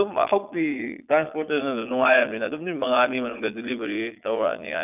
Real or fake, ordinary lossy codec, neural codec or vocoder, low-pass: fake; none; vocoder, 22.05 kHz, 80 mel bands, Vocos; 3.6 kHz